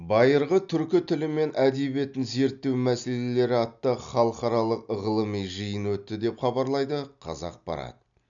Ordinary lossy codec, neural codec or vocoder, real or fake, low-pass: none; none; real; 7.2 kHz